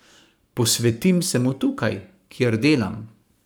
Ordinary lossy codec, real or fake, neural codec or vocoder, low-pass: none; fake; codec, 44.1 kHz, 7.8 kbps, Pupu-Codec; none